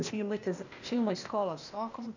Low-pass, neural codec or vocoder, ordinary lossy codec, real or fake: 7.2 kHz; codec, 16 kHz, 0.8 kbps, ZipCodec; none; fake